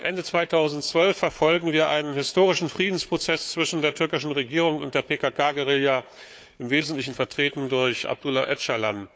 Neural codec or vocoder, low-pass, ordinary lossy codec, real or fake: codec, 16 kHz, 4 kbps, FunCodec, trained on Chinese and English, 50 frames a second; none; none; fake